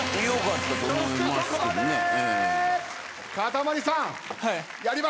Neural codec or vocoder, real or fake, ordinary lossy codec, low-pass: none; real; none; none